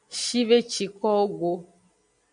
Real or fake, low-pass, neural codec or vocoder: real; 9.9 kHz; none